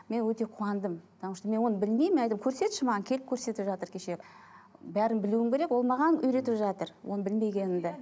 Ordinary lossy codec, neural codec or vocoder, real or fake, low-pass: none; none; real; none